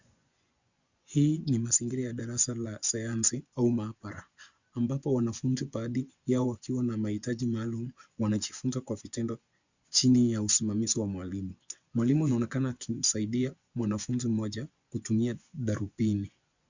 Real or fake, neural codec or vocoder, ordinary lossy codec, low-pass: fake; vocoder, 24 kHz, 100 mel bands, Vocos; Opus, 64 kbps; 7.2 kHz